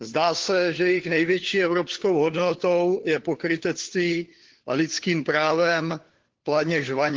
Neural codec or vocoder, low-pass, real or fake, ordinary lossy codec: codec, 16 kHz, 4 kbps, FunCodec, trained on LibriTTS, 50 frames a second; 7.2 kHz; fake; Opus, 16 kbps